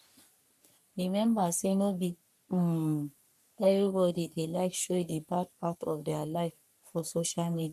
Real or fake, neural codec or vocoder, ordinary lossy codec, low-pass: fake; codec, 44.1 kHz, 3.4 kbps, Pupu-Codec; none; 14.4 kHz